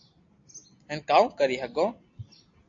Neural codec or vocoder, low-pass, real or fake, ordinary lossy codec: none; 7.2 kHz; real; AAC, 48 kbps